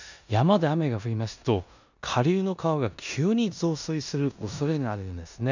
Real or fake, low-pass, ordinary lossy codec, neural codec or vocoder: fake; 7.2 kHz; none; codec, 16 kHz in and 24 kHz out, 0.9 kbps, LongCat-Audio-Codec, four codebook decoder